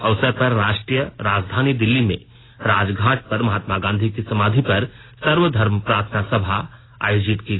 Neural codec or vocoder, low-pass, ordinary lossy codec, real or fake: none; 7.2 kHz; AAC, 16 kbps; real